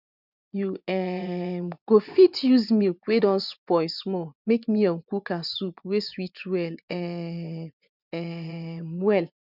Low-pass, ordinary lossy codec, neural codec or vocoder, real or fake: 5.4 kHz; none; vocoder, 24 kHz, 100 mel bands, Vocos; fake